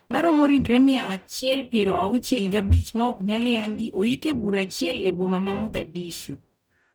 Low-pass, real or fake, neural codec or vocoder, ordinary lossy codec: none; fake; codec, 44.1 kHz, 0.9 kbps, DAC; none